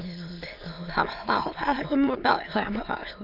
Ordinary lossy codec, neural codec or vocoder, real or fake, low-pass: none; autoencoder, 22.05 kHz, a latent of 192 numbers a frame, VITS, trained on many speakers; fake; 5.4 kHz